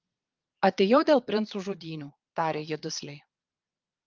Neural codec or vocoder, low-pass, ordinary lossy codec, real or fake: vocoder, 22.05 kHz, 80 mel bands, Vocos; 7.2 kHz; Opus, 32 kbps; fake